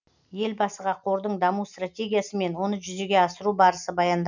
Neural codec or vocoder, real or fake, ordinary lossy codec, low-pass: none; real; none; 7.2 kHz